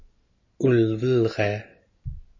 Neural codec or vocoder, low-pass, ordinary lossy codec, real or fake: none; 7.2 kHz; MP3, 32 kbps; real